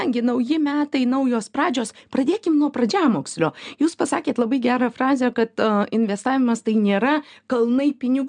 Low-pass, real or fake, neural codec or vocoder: 9.9 kHz; real; none